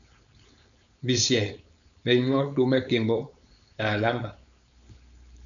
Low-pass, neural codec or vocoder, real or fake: 7.2 kHz; codec, 16 kHz, 4.8 kbps, FACodec; fake